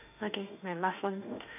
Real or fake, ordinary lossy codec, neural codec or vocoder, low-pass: fake; none; autoencoder, 48 kHz, 32 numbers a frame, DAC-VAE, trained on Japanese speech; 3.6 kHz